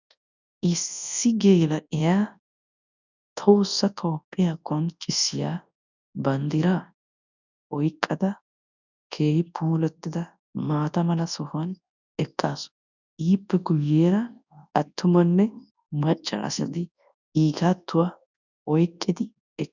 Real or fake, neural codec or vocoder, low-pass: fake; codec, 24 kHz, 0.9 kbps, WavTokenizer, large speech release; 7.2 kHz